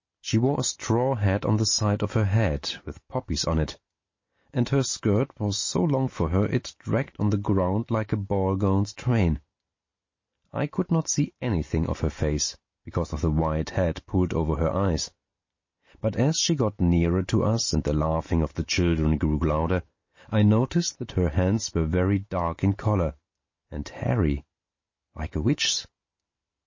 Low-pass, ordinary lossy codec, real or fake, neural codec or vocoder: 7.2 kHz; MP3, 32 kbps; real; none